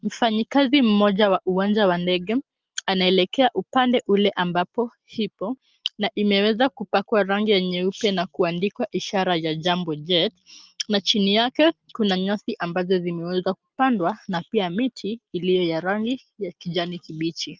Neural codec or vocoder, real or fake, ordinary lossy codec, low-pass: none; real; Opus, 24 kbps; 7.2 kHz